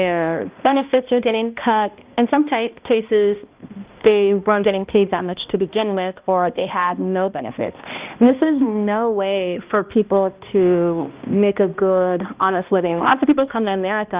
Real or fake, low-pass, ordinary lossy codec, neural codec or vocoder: fake; 3.6 kHz; Opus, 24 kbps; codec, 16 kHz, 1 kbps, X-Codec, HuBERT features, trained on balanced general audio